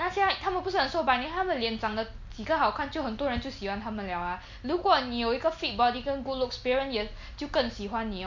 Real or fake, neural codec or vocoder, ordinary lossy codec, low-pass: real; none; MP3, 48 kbps; 7.2 kHz